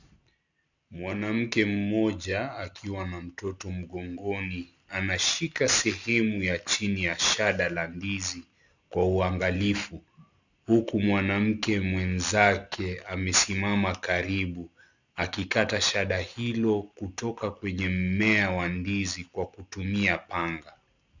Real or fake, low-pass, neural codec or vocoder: real; 7.2 kHz; none